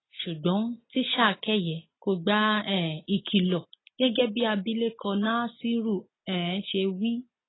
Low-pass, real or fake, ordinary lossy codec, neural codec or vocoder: 7.2 kHz; real; AAC, 16 kbps; none